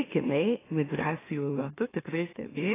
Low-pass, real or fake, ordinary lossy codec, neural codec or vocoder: 3.6 kHz; fake; AAC, 16 kbps; autoencoder, 44.1 kHz, a latent of 192 numbers a frame, MeloTTS